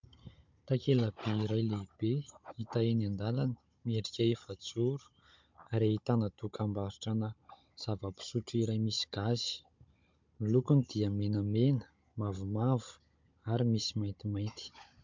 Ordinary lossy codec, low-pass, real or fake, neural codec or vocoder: MP3, 64 kbps; 7.2 kHz; fake; codec, 16 kHz, 16 kbps, FunCodec, trained on Chinese and English, 50 frames a second